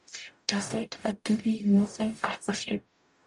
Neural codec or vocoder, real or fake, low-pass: codec, 44.1 kHz, 0.9 kbps, DAC; fake; 10.8 kHz